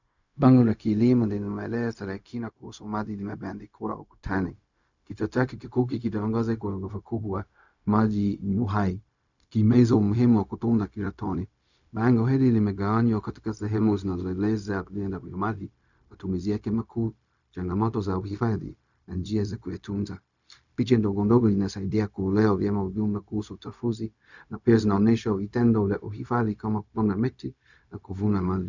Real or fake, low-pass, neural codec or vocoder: fake; 7.2 kHz; codec, 16 kHz, 0.4 kbps, LongCat-Audio-Codec